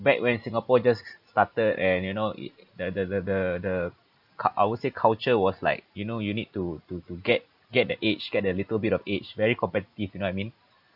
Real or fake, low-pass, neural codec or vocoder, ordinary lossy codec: real; 5.4 kHz; none; none